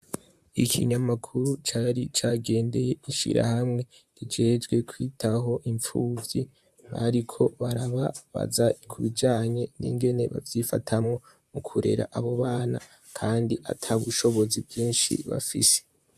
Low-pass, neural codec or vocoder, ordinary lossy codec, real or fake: 14.4 kHz; vocoder, 44.1 kHz, 128 mel bands, Pupu-Vocoder; AAC, 96 kbps; fake